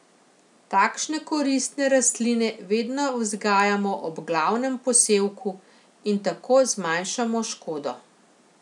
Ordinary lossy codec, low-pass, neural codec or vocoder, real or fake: none; 10.8 kHz; none; real